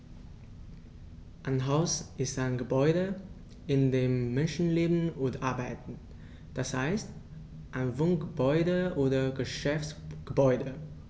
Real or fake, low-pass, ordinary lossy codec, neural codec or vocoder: real; none; none; none